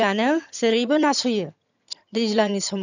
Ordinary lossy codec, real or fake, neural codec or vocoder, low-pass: none; fake; vocoder, 22.05 kHz, 80 mel bands, HiFi-GAN; 7.2 kHz